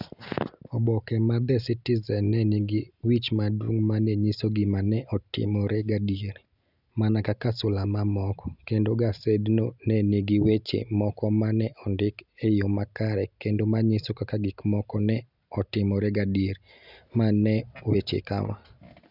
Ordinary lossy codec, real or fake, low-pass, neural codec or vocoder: none; real; 5.4 kHz; none